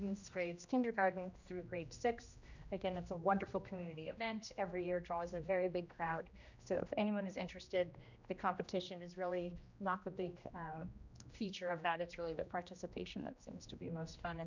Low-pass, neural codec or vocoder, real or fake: 7.2 kHz; codec, 16 kHz, 1 kbps, X-Codec, HuBERT features, trained on general audio; fake